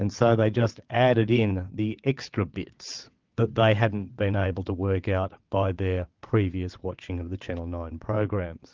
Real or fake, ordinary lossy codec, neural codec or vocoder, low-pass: fake; Opus, 32 kbps; vocoder, 22.05 kHz, 80 mel bands, WaveNeXt; 7.2 kHz